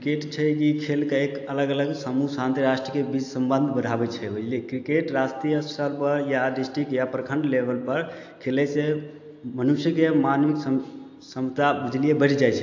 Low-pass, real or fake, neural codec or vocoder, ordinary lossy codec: 7.2 kHz; real; none; AAC, 48 kbps